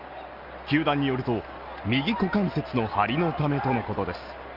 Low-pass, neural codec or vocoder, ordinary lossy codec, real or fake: 5.4 kHz; none; Opus, 24 kbps; real